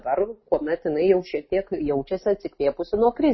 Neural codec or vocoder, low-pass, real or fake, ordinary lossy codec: none; 7.2 kHz; real; MP3, 24 kbps